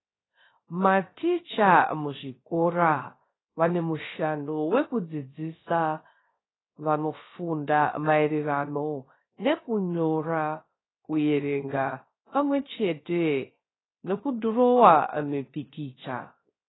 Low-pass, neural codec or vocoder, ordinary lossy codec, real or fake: 7.2 kHz; codec, 16 kHz, 0.3 kbps, FocalCodec; AAC, 16 kbps; fake